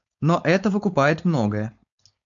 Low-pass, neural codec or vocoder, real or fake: 7.2 kHz; codec, 16 kHz, 4.8 kbps, FACodec; fake